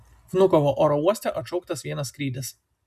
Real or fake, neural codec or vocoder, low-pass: real; none; 14.4 kHz